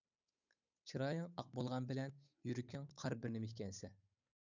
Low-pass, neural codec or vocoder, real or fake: 7.2 kHz; codec, 16 kHz, 8 kbps, FunCodec, trained on Chinese and English, 25 frames a second; fake